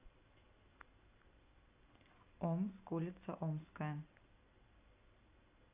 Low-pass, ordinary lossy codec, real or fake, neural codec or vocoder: 3.6 kHz; AAC, 32 kbps; real; none